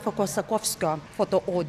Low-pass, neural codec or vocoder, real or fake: 14.4 kHz; none; real